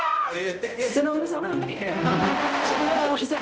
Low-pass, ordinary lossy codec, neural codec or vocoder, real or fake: none; none; codec, 16 kHz, 0.5 kbps, X-Codec, HuBERT features, trained on balanced general audio; fake